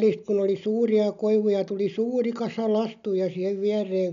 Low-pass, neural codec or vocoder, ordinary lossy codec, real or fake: 7.2 kHz; none; none; real